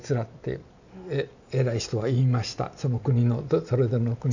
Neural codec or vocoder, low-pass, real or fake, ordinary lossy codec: none; 7.2 kHz; real; none